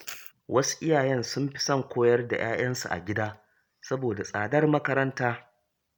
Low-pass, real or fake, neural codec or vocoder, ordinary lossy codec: none; real; none; none